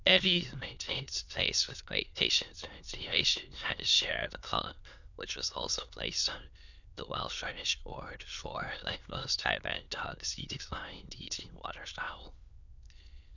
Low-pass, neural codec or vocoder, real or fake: 7.2 kHz; autoencoder, 22.05 kHz, a latent of 192 numbers a frame, VITS, trained on many speakers; fake